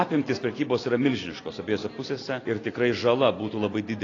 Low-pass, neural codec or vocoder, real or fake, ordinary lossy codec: 7.2 kHz; none; real; AAC, 32 kbps